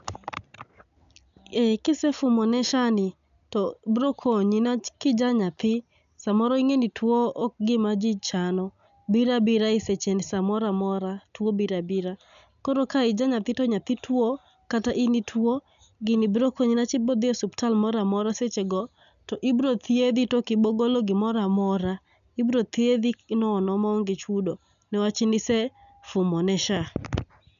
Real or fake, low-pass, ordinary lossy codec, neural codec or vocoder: real; 7.2 kHz; none; none